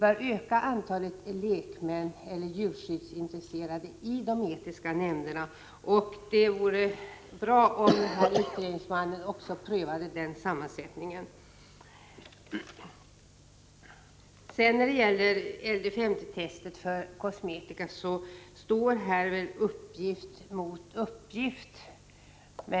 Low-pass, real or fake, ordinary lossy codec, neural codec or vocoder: none; real; none; none